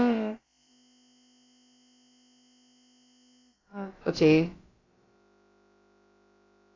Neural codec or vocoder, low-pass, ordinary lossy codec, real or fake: codec, 16 kHz, about 1 kbps, DyCAST, with the encoder's durations; 7.2 kHz; AAC, 32 kbps; fake